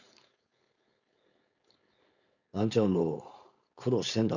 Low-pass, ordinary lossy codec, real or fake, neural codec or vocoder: 7.2 kHz; none; fake; codec, 16 kHz, 4.8 kbps, FACodec